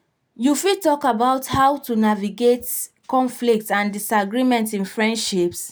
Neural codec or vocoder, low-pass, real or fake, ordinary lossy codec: none; none; real; none